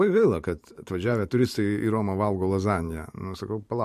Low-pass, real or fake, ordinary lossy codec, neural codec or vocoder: 14.4 kHz; fake; MP3, 64 kbps; vocoder, 44.1 kHz, 128 mel bands every 512 samples, BigVGAN v2